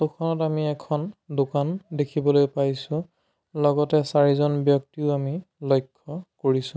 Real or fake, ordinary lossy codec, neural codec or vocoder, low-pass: real; none; none; none